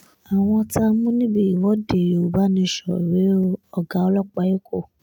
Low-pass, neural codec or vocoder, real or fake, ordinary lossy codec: none; none; real; none